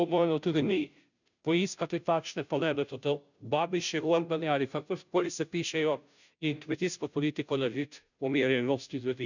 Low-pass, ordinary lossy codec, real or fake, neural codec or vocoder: 7.2 kHz; none; fake; codec, 16 kHz, 0.5 kbps, FunCodec, trained on Chinese and English, 25 frames a second